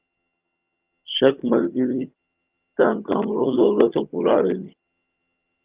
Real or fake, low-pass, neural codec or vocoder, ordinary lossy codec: fake; 3.6 kHz; vocoder, 22.05 kHz, 80 mel bands, HiFi-GAN; Opus, 32 kbps